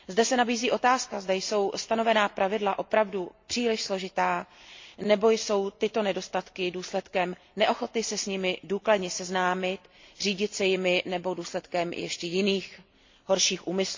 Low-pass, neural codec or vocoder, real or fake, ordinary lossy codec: 7.2 kHz; none; real; MP3, 48 kbps